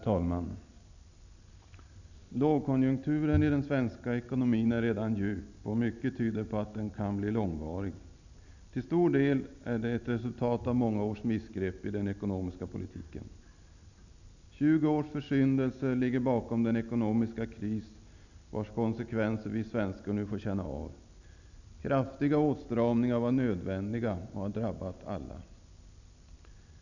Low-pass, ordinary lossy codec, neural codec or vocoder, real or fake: 7.2 kHz; none; none; real